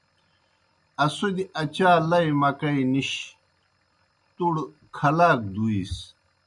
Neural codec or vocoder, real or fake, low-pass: none; real; 10.8 kHz